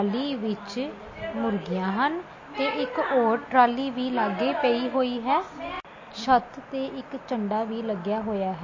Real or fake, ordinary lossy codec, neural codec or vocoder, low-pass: real; MP3, 32 kbps; none; 7.2 kHz